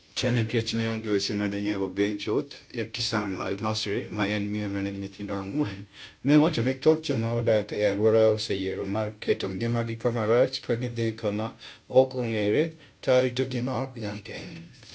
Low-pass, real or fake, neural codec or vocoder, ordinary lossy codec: none; fake; codec, 16 kHz, 0.5 kbps, FunCodec, trained on Chinese and English, 25 frames a second; none